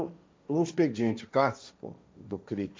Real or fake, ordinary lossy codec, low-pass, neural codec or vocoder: fake; none; none; codec, 16 kHz, 1.1 kbps, Voila-Tokenizer